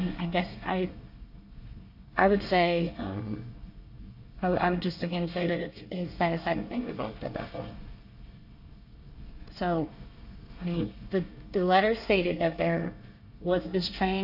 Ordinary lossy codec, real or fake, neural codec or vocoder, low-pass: AAC, 48 kbps; fake; codec, 24 kHz, 1 kbps, SNAC; 5.4 kHz